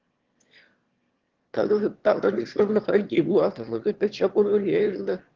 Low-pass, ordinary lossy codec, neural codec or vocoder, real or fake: 7.2 kHz; Opus, 16 kbps; autoencoder, 22.05 kHz, a latent of 192 numbers a frame, VITS, trained on one speaker; fake